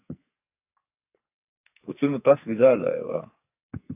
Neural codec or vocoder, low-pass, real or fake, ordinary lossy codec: codec, 44.1 kHz, 2.6 kbps, SNAC; 3.6 kHz; fake; AAC, 32 kbps